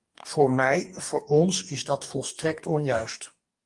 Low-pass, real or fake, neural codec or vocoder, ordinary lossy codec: 10.8 kHz; fake; codec, 44.1 kHz, 2.6 kbps, DAC; Opus, 32 kbps